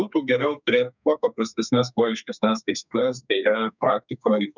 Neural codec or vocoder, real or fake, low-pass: codec, 32 kHz, 1.9 kbps, SNAC; fake; 7.2 kHz